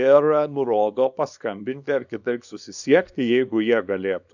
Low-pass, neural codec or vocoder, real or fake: 7.2 kHz; codec, 24 kHz, 0.9 kbps, WavTokenizer, small release; fake